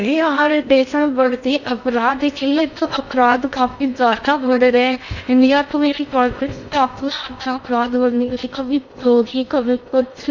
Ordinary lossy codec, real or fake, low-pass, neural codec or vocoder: none; fake; 7.2 kHz; codec, 16 kHz in and 24 kHz out, 0.6 kbps, FocalCodec, streaming, 2048 codes